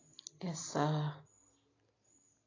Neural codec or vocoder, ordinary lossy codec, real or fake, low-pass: none; AAC, 32 kbps; real; 7.2 kHz